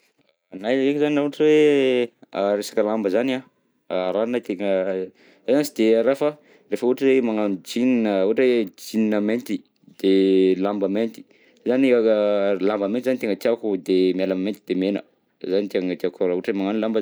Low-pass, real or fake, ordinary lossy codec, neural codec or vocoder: none; real; none; none